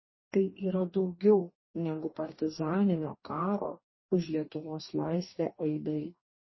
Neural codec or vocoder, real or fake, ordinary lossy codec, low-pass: codec, 44.1 kHz, 2.6 kbps, DAC; fake; MP3, 24 kbps; 7.2 kHz